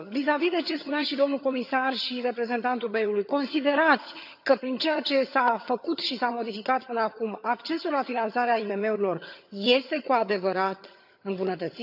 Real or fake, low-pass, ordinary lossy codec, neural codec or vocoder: fake; 5.4 kHz; none; vocoder, 22.05 kHz, 80 mel bands, HiFi-GAN